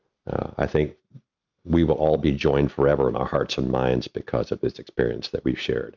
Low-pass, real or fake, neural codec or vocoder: 7.2 kHz; real; none